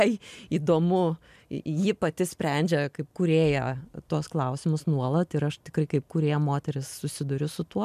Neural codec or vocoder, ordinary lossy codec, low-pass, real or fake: none; MP3, 96 kbps; 14.4 kHz; real